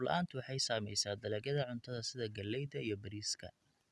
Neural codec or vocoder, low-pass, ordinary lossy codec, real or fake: none; none; none; real